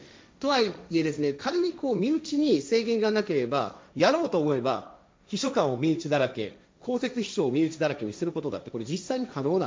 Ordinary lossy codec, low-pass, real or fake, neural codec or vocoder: none; none; fake; codec, 16 kHz, 1.1 kbps, Voila-Tokenizer